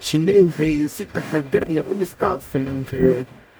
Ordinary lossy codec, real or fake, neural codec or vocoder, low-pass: none; fake; codec, 44.1 kHz, 0.9 kbps, DAC; none